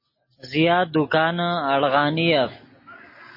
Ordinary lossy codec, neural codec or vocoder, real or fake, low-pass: MP3, 24 kbps; none; real; 5.4 kHz